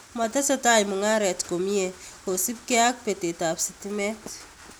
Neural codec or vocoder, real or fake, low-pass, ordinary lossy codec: none; real; none; none